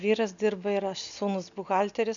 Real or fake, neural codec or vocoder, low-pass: real; none; 7.2 kHz